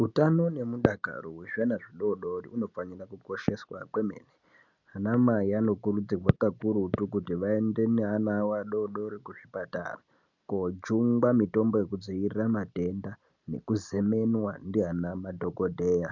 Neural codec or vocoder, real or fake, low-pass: none; real; 7.2 kHz